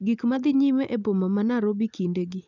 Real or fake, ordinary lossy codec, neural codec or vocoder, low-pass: real; none; none; 7.2 kHz